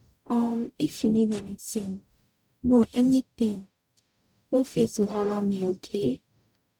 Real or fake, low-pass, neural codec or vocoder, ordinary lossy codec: fake; 19.8 kHz; codec, 44.1 kHz, 0.9 kbps, DAC; none